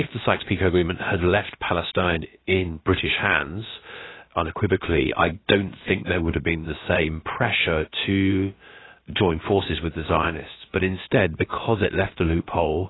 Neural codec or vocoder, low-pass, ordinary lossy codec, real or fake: codec, 16 kHz, about 1 kbps, DyCAST, with the encoder's durations; 7.2 kHz; AAC, 16 kbps; fake